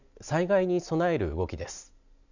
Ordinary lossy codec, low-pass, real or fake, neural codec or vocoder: none; 7.2 kHz; real; none